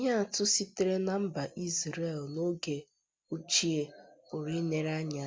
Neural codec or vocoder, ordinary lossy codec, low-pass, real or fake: none; none; none; real